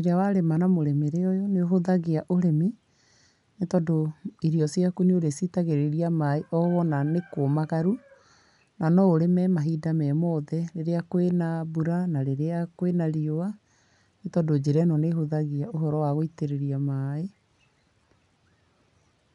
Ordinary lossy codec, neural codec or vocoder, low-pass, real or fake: none; none; 10.8 kHz; real